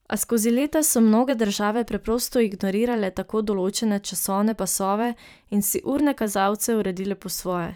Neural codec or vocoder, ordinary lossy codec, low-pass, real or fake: vocoder, 44.1 kHz, 128 mel bands every 512 samples, BigVGAN v2; none; none; fake